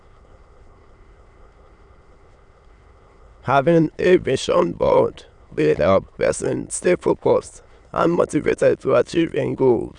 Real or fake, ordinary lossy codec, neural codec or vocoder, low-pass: fake; none; autoencoder, 22.05 kHz, a latent of 192 numbers a frame, VITS, trained on many speakers; 9.9 kHz